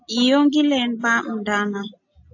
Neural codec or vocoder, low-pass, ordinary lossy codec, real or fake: none; 7.2 kHz; AAC, 48 kbps; real